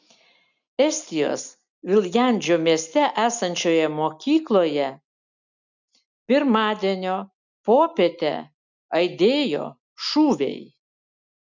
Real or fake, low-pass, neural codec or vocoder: real; 7.2 kHz; none